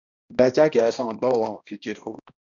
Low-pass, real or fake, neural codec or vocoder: 7.2 kHz; fake; codec, 16 kHz, 1 kbps, X-Codec, HuBERT features, trained on balanced general audio